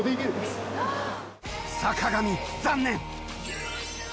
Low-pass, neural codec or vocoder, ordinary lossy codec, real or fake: none; none; none; real